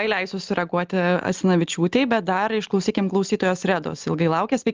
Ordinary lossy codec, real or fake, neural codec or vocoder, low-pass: Opus, 24 kbps; real; none; 7.2 kHz